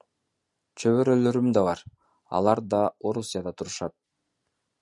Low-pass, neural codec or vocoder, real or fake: 10.8 kHz; none; real